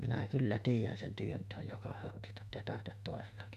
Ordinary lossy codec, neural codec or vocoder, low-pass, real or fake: none; autoencoder, 48 kHz, 32 numbers a frame, DAC-VAE, trained on Japanese speech; 14.4 kHz; fake